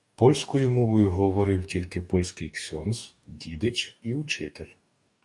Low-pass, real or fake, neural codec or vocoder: 10.8 kHz; fake; codec, 44.1 kHz, 2.6 kbps, DAC